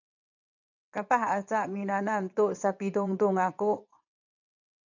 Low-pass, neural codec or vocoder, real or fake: 7.2 kHz; vocoder, 22.05 kHz, 80 mel bands, WaveNeXt; fake